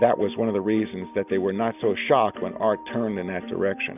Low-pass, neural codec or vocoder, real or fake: 3.6 kHz; none; real